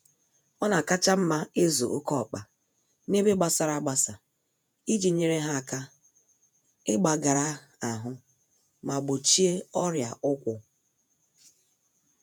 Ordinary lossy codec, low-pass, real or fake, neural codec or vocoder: none; none; fake; vocoder, 48 kHz, 128 mel bands, Vocos